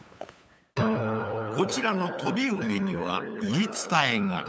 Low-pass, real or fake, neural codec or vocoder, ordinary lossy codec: none; fake; codec, 16 kHz, 8 kbps, FunCodec, trained on LibriTTS, 25 frames a second; none